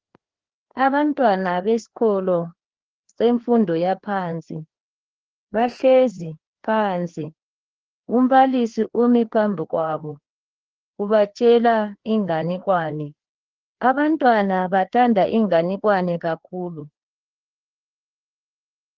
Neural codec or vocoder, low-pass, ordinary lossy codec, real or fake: codec, 16 kHz, 2 kbps, FreqCodec, larger model; 7.2 kHz; Opus, 16 kbps; fake